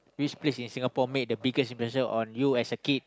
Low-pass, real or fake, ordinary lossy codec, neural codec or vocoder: none; real; none; none